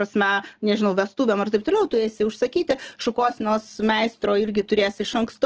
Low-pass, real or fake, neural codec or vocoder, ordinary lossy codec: 7.2 kHz; real; none; Opus, 16 kbps